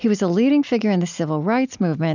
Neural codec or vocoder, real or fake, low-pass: none; real; 7.2 kHz